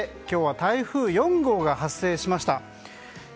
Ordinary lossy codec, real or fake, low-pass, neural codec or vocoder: none; real; none; none